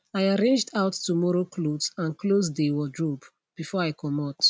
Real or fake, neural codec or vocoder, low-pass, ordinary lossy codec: real; none; none; none